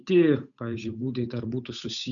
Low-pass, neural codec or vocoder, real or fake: 7.2 kHz; codec, 16 kHz, 8 kbps, FunCodec, trained on Chinese and English, 25 frames a second; fake